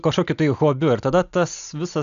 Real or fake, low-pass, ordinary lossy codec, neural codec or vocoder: real; 7.2 kHz; AAC, 96 kbps; none